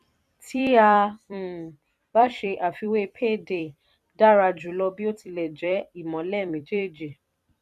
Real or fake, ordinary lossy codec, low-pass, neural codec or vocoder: fake; none; 14.4 kHz; vocoder, 44.1 kHz, 128 mel bands every 256 samples, BigVGAN v2